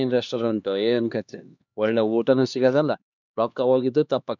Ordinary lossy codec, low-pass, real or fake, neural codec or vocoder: none; 7.2 kHz; fake; codec, 16 kHz, 2 kbps, X-Codec, HuBERT features, trained on LibriSpeech